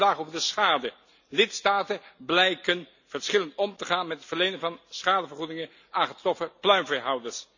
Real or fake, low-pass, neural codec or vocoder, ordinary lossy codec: real; 7.2 kHz; none; none